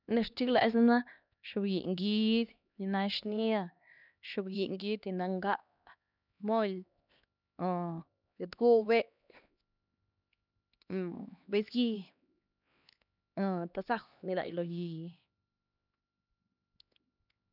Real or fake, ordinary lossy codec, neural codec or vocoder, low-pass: fake; none; codec, 16 kHz, 2 kbps, X-Codec, HuBERT features, trained on LibriSpeech; 5.4 kHz